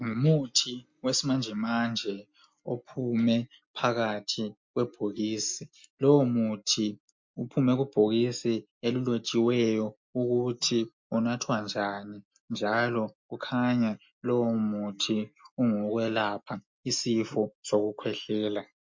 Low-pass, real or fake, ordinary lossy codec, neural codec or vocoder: 7.2 kHz; real; MP3, 48 kbps; none